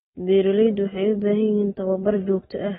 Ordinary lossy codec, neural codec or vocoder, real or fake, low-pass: AAC, 16 kbps; none; real; 19.8 kHz